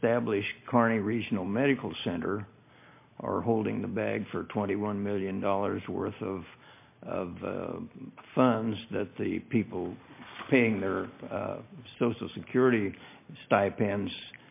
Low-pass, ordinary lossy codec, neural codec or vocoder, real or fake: 3.6 kHz; MP3, 24 kbps; none; real